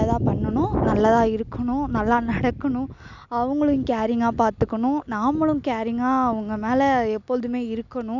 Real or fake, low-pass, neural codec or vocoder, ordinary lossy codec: real; 7.2 kHz; none; none